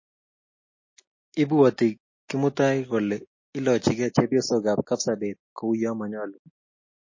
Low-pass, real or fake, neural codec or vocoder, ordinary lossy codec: 7.2 kHz; real; none; MP3, 32 kbps